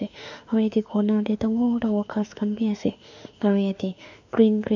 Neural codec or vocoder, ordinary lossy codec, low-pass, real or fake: autoencoder, 48 kHz, 32 numbers a frame, DAC-VAE, trained on Japanese speech; none; 7.2 kHz; fake